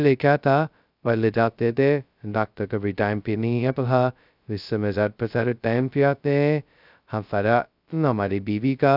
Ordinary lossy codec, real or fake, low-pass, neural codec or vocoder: none; fake; 5.4 kHz; codec, 16 kHz, 0.2 kbps, FocalCodec